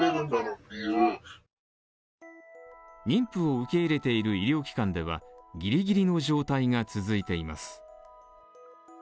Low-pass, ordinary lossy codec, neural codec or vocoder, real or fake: none; none; none; real